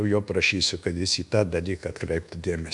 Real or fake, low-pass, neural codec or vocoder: fake; 10.8 kHz; codec, 24 kHz, 1.2 kbps, DualCodec